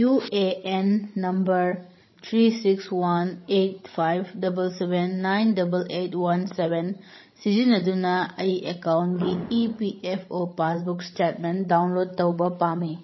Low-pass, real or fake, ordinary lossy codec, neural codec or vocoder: 7.2 kHz; fake; MP3, 24 kbps; codec, 16 kHz, 16 kbps, FreqCodec, larger model